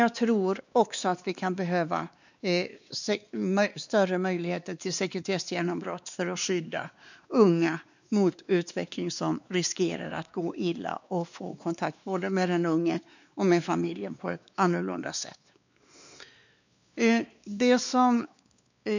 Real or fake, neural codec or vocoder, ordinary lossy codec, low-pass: fake; codec, 16 kHz, 2 kbps, X-Codec, WavLM features, trained on Multilingual LibriSpeech; none; 7.2 kHz